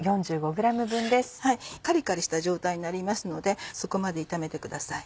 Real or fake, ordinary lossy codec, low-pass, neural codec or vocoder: real; none; none; none